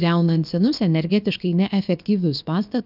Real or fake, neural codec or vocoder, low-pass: fake; codec, 16 kHz, about 1 kbps, DyCAST, with the encoder's durations; 5.4 kHz